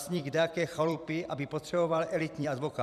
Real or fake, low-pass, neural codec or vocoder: fake; 14.4 kHz; vocoder, 44.1 kHz, 128 mel bands every 256 samples, BigVGAN v2